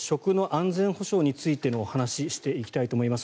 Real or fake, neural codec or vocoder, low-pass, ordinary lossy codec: real; none; none; none